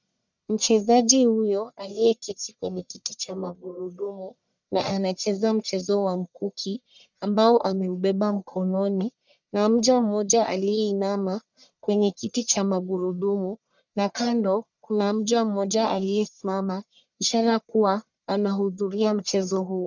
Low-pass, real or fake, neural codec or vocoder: 7.2 kHz; fake; codec, 44.1 kHz, 1.7 kbps, Pupu-Codec